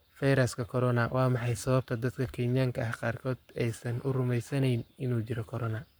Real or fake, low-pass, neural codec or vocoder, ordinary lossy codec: fake; none; codec, 44.1 kHz, 7.8 kbps, Pupu-Codec; none